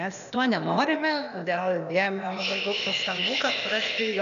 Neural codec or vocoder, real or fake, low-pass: codec, 16 kHz, 0.8 kbps, ZipCodec; fake; 7.2 kHz